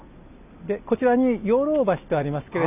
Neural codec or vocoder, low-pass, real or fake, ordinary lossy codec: none; 3.6 kHz; real; none